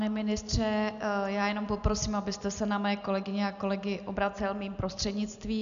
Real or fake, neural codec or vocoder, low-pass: real; none; 7.2 kHz